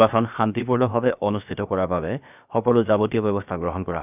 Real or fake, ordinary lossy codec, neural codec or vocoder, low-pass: fake; none; codec, 16 kHz, about 1 kbps, DyCAST, with the encoder's durations; 3.6 kHz